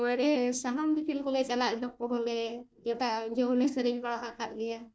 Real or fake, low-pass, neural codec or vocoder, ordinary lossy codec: fake; none; codec, 16 kHz, 1 kbps, FunCodec, trained on Chinese and English, 50 frames a second; none